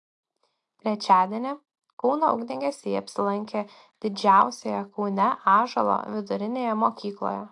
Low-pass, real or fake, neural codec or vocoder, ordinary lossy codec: 10.8 kHz; real; none; AAC, 64 kbps